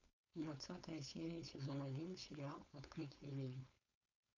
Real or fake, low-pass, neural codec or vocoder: fake; 7.2 kHz; codec, 16 kHz, 4.8 kbps, FACodec